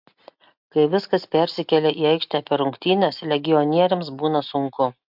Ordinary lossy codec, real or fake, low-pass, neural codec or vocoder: MP3, 48 kbps; real; 5.4 kHz; none